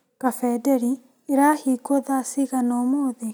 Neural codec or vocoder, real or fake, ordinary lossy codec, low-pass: none; real; none; none